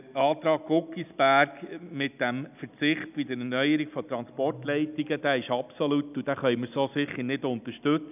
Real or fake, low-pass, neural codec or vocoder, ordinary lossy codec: real; 3.6 kHz; none; none